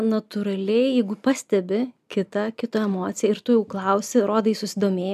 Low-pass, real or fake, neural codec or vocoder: 14.4 kHz; real; none